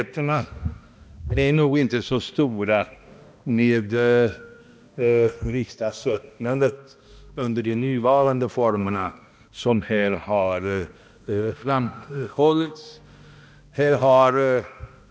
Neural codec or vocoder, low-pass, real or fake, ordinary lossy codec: codec, 16 kHz, 1 kbps, X-Codec, HuBERT features, trained on balanced general audio; none; fake; none